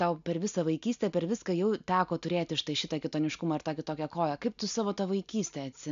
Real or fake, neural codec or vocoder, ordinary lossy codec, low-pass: real; none; MP3, 64 kbps; 7.2 kHz